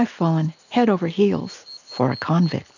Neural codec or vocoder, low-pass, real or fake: none; 7.2 kHz; real